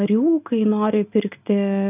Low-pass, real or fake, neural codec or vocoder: 3.6 kHz; real; none